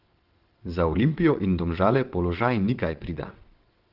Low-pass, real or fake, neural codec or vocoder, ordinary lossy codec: 5.4 kHz; fake; vocoder, 22.05 kHz, 80 mel bands, WaveNeXt; Opus, 16 kbps